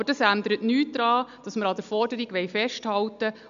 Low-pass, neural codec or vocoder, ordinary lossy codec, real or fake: 7.2 kHz; none; none; real